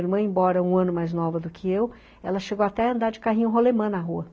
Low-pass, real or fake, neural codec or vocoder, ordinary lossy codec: none; real; none; none